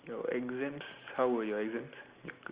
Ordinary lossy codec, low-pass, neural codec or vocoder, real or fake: Opus, 32 kbps; 3.6 kHz; none; real